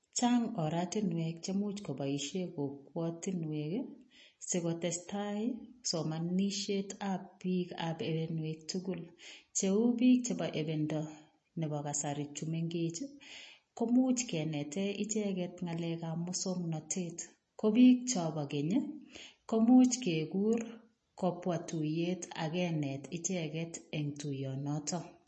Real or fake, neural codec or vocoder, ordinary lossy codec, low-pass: real; none; MP3, 32 kbps; 9.9 kHz